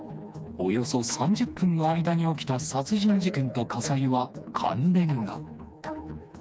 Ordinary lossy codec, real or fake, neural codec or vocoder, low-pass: none; fake; codec, 16 kHz, 2 kbps, FreqCodec, smaller model; none